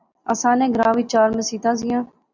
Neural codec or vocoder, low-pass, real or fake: none; 7.2 kHz; real